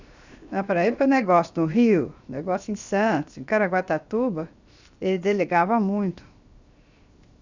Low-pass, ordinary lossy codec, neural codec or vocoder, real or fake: 7.2 kHz; Opus, 64 kbps; codec, 16 kHz, 0.7 kbps, FocalCodec; fake